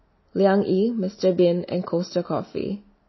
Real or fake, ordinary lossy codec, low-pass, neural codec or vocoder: real; MP3, 24 kbps; 7.2 kHz; none